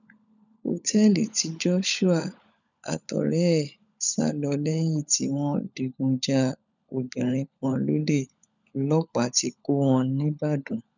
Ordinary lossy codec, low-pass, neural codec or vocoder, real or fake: none; 7.2 kHz; codec, 16 kHz, 16 kbps, FunCodec, trained on LibriTTS, 50 frames a second; fake